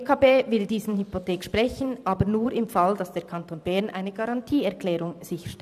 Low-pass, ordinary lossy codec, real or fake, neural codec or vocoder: 14.4 kHz; none; fake; vocoder, 44.1 kHz, 128 mel bands every 512 samples, BigVGAN v2